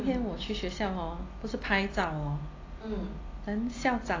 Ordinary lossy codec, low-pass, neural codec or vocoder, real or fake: AAC, 32 kbps; 7.2 kHz; none; real